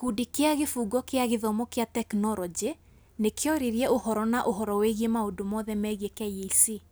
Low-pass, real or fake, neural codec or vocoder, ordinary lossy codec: none; real; none; none